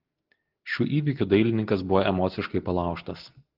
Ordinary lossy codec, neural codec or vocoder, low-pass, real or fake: Opus, 16 kbps; none; 5.4 kHz; real